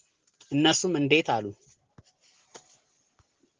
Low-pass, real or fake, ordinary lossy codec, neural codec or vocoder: 7.2 kHz; real; Opus, 16 kbps; none